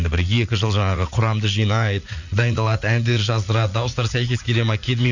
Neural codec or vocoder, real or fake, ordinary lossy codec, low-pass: vocoder, 44.1 kHz, 80 mel bands, Vocos; fake; none; 7.2 kHz